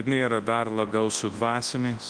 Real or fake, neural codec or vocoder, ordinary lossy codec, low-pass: fake; codec, 24 kHz, 0.9 kbps, WavTokenizer, large speech release; Opus, 24 kbps; 9.9 kHz